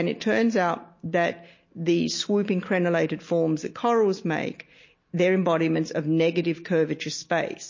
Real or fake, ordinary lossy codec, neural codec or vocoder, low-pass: real; MP3, 32 kbps; none; 7.2 kHz